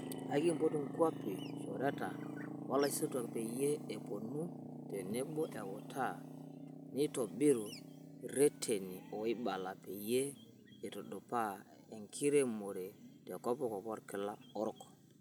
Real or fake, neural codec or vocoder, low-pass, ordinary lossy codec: real; none; none; none